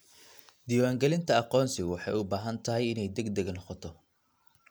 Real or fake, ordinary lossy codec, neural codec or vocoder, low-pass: real; none; none; none